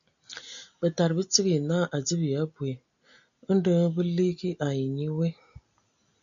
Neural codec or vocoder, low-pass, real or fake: none; 7.2 kHz; real